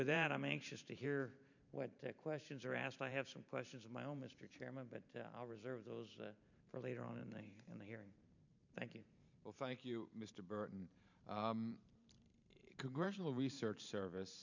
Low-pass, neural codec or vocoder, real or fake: 7.2 kHz; vocoder, 44.1 kHz, 128 mel bands every 512 samples, BigVGAN v2; fake